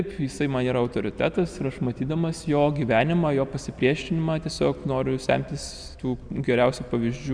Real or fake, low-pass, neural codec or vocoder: real; 9.9 kHz; none